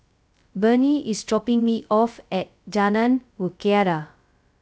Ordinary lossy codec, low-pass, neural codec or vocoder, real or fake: none; none; codec, 16 kHz, 0.2 kbps, FocalCodec; fake